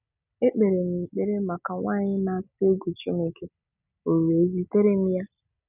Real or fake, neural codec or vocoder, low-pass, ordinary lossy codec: real; none; 3.6 kHz; none